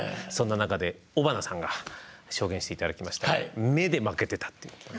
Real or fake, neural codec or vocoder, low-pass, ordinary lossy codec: real; none; none; none